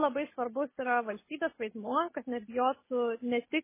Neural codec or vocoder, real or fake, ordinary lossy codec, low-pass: none; real; MP3, 16 kbps; 3.6 kHz